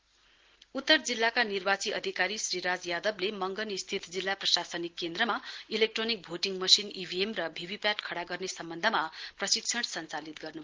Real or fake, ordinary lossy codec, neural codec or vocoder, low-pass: real; Opus, 16 kbps; none; 7.2 kHz